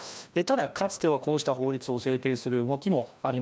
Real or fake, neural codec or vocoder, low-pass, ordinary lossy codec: fake; codec, 16 kHz, 1 kbps, FreqCodec, larger model; none; none